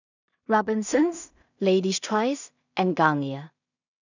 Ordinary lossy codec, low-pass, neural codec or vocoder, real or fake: none; 7.2 kHz; codec, 16 kHz in and 24 kHz out, 0.4 kbps, LongCat-Audio-Codec, two codebook decoder; fake